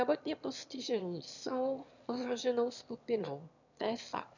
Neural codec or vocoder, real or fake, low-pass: autoencoder, 22.05 kHz, a latent of 192 numbers a frame, VITS, trained on one speaker; fake; 7.2 kHz